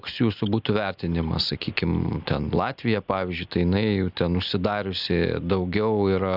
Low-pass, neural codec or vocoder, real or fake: 5.4 kHz; none; real